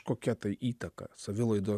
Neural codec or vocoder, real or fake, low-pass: none; real; 14.4 kHz